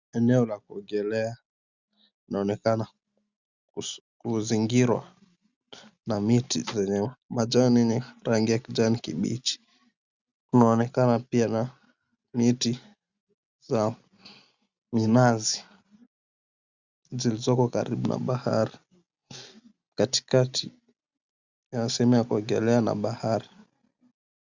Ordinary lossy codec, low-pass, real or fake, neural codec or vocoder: Opus, 64 kbps; 7.2 kHz; real; none